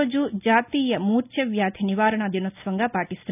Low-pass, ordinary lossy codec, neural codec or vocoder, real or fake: 3.6 kHz; none; none; real